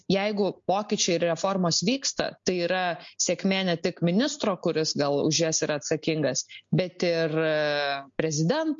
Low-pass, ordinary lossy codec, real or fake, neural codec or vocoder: 7.2 kHz; MP3, 48 kbps; real; none